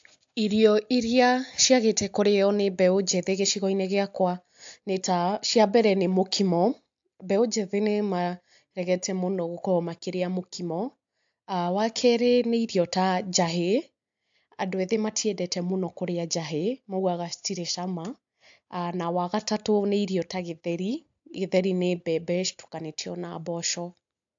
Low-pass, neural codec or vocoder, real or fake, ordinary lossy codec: 7.2 kHz; none; real; AAC, 64 kbps